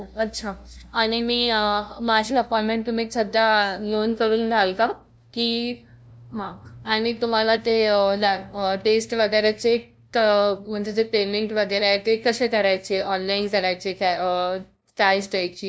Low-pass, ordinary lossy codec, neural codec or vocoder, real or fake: none; none; codec, 16 kHz, 0.5 kbps, FunCodec, trained on LibriTTS, 25 frames a second; fake